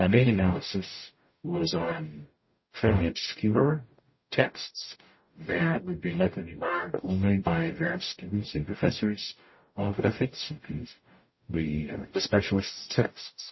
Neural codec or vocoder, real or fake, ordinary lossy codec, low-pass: codec, 44.1 kHz, 0.9 kbps, DAC; fake; MP3, 24 kbps; 7.2 kHz